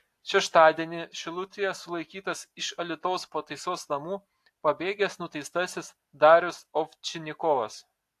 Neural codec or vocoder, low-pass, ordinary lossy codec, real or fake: none; 14.4 kHz; AAC, 64 kbps; real